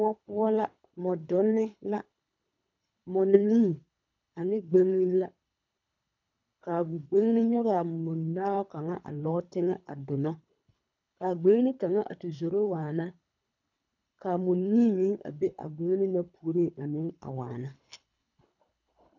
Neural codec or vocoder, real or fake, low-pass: codec, 24 kHz, 3 kbps, HILCodec; fake; 7.2 kHz